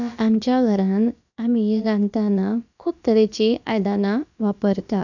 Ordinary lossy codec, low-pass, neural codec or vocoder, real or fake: none; 7.2 kHz; codec, 16 kHz, about 1 kbps, DyCAST, with the encoder's durations; fake